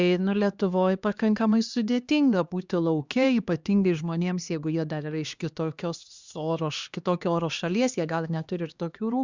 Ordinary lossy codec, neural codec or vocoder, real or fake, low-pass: Opus, 64 kbps; codec, 16 kHz, 2 kbps, X-Codec, HuBERT features, trained on LibriSpeech; fake; 7.2 kHz